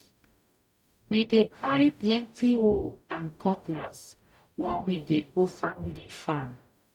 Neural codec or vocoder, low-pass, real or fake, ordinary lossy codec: codec, 44.1 kHz, 0.9 kbps, DAC; 19.8 kHz; fake; none